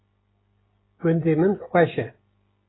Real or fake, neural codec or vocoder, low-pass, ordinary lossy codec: real; none; 7.2 kHz; AAC, 16 kbps